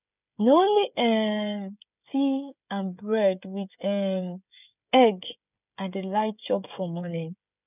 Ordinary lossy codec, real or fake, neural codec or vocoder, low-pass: none; fake; codec, 16 kHz, 16 kbps, FreqCodec, smaller model; 3.6 kHz